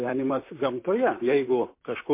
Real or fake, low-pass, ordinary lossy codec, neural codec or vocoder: fake; 3.6 kHz; MP3, 24 kbps; vocoder, 44.1 kHz, 128 mel bands, Pupu-Vocoder